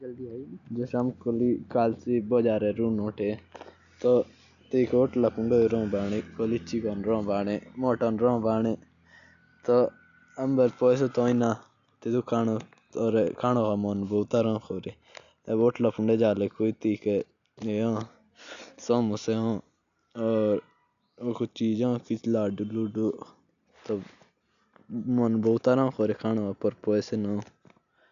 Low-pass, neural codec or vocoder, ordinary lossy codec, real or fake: 7.2 kHz; none; none; real